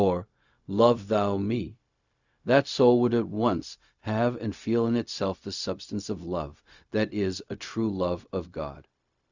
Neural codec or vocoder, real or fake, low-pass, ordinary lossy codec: codec, 16 kHz, 0.4 kbps, LongCat-Audio-Codec; fake; 7.2 kHz; Opus, 64 kbps